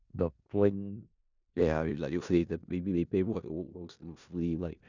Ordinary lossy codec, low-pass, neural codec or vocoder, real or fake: none; 7.2 kHz; codec, 16 kHz in and 24 kHz out, 0.4 kbps, LongCat-Audio-Codec, four codebook decoder; fake